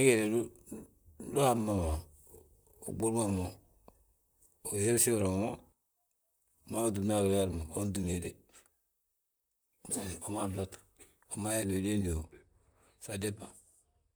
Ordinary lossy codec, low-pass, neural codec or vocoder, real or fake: none; none; vocoder, 44.1 kHz, 128 mel bands, Pupu-Vocoder; fake